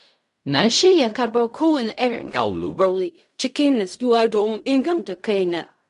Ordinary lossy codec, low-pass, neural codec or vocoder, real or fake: MP3, 64 kbps; 10.8 kHz; codec, 16 kHz in and 24 kHz out, 0.4 kbps, LongCat-Audio-Codec, fine tuned four codebook decoder; fake